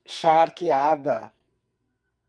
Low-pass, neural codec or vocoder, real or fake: 9.9 kHz; codec, 44.1 kHz, 2.6 kbps, SNAC; fake